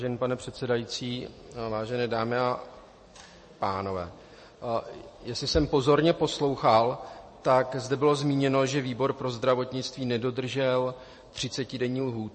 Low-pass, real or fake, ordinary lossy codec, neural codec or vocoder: 10.8 kHz; fake; MP3, 32 kbps; vocoder, 44.1 kHz, 128 mel bands every 256 samples, BigVGAN v2